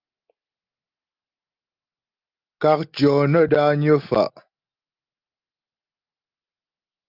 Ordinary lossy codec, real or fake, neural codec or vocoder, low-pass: Opus, 32 kbps; real; none; 5.4 kHz